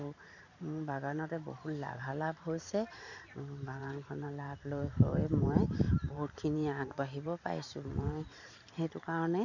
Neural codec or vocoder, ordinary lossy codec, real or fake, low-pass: vocoder, 44.1 kHz, 128 mel bands every 512 samples, BigVGAN v2; none; fake; 7.2 kHz